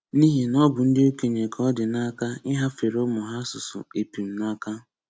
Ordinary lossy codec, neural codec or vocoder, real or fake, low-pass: none; none; real; none